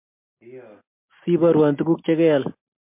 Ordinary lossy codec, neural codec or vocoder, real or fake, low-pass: MP3, 32 kbps; none; real; 3.6 kHz